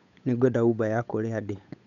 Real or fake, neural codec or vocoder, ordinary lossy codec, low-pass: fake; codec, 16 kHz, 8 kbps, FunCodec, trained on Chinese and English, 25 frames a second; none; 7.2 kHz